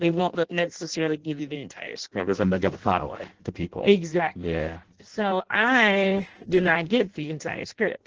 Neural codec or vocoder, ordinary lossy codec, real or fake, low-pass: codec, 16 kHz in and 24 kHz out, 0.6 kbps, FireRedTTS-2 codec; Opus, 16 kbps; fake; 7.2 kHz